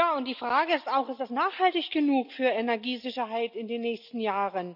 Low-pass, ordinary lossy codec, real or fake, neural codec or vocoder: 5.4 kHz; none; real; none